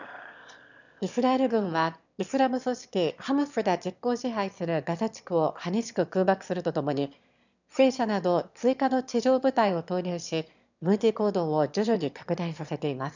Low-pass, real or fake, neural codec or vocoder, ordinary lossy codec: 7.2 kHz; fake; autoencoder, 22.05 kHz, a latent of 192 numbers a frame, VITS, trained on one speaker; none